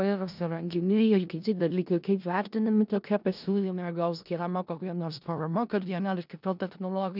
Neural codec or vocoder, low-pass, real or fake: codec, 16 kHz in and 24 kHz out, 0.4 kbps, LongCat-Audio-Codec, four codebook decoder; 5.4 kHz; fake